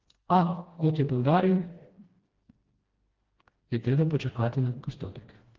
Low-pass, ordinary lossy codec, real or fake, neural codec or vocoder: 7.2 kHz; Opus, 16 kbps; fake; codec, 16 kHz, 1 kbps, FreqCodec, smaller model